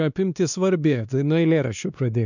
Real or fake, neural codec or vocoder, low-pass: fake; codec, 16 kHz, 2 kbps, X-Codec, WavLM features, trained on Multilingual LibriSpeech; 7.2 kHz